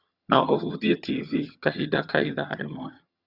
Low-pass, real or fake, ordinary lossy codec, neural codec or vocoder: 5.4 kHz; fake; Opus, 64 kbps; vocoder, 22.05 kHz, 80 mel bands, HiFi-GAN